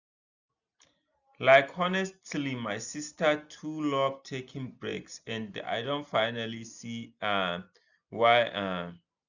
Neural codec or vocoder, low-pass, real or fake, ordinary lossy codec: none; 7.2 kHz; real; none